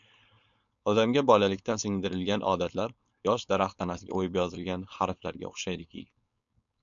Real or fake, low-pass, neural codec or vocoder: fake; 7.2 kHz; codec, 16 kHz, 4.8 kbps, FACodec